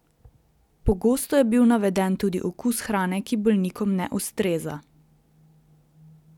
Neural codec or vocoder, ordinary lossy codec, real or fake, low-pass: none; none; real; 19.8 kHz